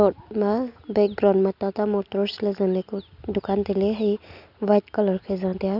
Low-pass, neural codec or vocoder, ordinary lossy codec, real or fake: 5.4 kHz; none; Opus, 64 kbps; real